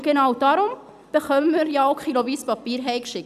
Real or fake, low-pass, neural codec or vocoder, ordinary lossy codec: real; 14.4 kHz; none; none